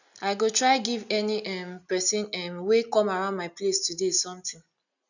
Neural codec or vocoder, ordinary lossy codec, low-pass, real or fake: none; none; 7.2 kHz; real